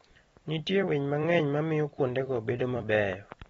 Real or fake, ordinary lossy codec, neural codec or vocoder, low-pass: fake; AAC, 24 kbps; vocoder, 44.1 kHz, 128 mel bands every 256 samples, BigVGAN v2; 19.8 kHz